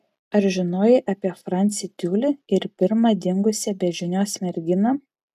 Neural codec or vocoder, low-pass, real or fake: none; 14.4 kHz; real